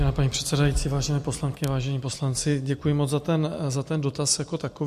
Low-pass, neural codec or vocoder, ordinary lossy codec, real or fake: 14.4 kHz; none; MP3, 64 kbps; real